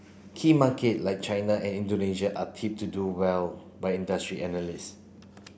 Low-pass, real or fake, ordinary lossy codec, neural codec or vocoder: none; real; none; none